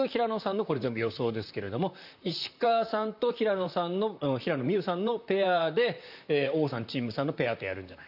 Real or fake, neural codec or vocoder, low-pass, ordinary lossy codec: fake; vocoder, 44.1 kHz, 128 mel bands, Pupu-Vocoder; 5.4 kHz; none